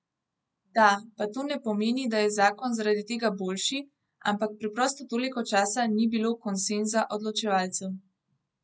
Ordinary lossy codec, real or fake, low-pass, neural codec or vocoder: none; real; none; none